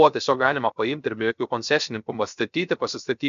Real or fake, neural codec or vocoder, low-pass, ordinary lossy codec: fake; codec, 16 kHz, 0.3 kbps, FocalCodec; 7.2 kHz; MP3, 64 kbps